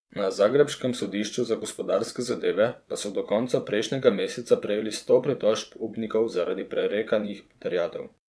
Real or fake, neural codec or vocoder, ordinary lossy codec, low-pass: fake; vocoder, 22.05 kHz, 80 mel bands, WaveNeXt; none; none